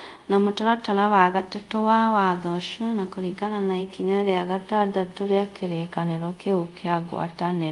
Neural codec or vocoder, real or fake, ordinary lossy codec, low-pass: codec, 24 kHz, 0.5 kbps, DualCodec; fake; Opus, 32 kbps; 10.8 kHz